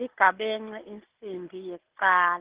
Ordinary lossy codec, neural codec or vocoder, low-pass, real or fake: Opus, 16 kbps; none; 3.6 kHz; real